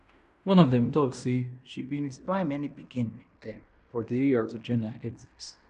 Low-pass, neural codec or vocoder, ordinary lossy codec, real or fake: 10.8 kHz; codec, 16 kHz in and 24 kHz out, 0.9 kbps, LongCat-Audio-Codec, fine tuned four codebook decoder; none; fake